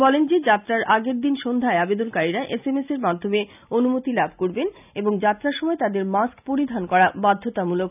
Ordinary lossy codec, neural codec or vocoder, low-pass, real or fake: none; none; 3.6 kHz; real